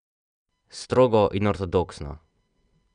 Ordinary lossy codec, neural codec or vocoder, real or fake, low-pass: none; none; real; 9.9 kHz